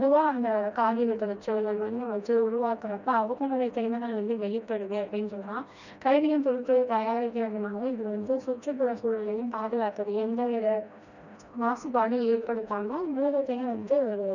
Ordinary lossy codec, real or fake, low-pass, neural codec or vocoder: none; fake; 7.2 kHz; codec, 16 kHz, 1 kbps, FreqCodec, smaller model